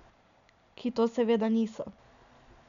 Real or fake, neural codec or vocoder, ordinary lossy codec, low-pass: real; none; none; 7.2 kHz